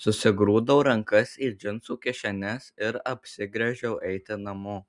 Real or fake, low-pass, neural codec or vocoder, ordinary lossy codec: real; 10.8 kHz; none; MP3, 96 kbps